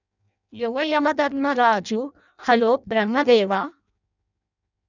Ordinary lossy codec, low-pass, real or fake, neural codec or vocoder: none; 7.2 kHz; fake; codec, 16 kHz in and 24 kHz out, 0.6 kbps, FireRedTTS-2 codec